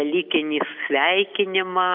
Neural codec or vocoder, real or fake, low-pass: none; real; 5.4 kHz